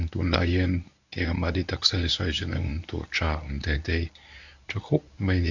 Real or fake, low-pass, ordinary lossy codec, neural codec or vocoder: fake; 7.2 kHz; none; codec, 24 kHz, 0.9 kbps, WavTokenizer, medium speech release version 1